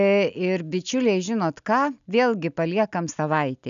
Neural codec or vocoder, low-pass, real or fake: none; 7.2 kHz; real